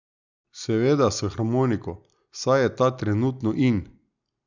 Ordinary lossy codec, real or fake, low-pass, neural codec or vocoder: none; real; 7.2 kHz; none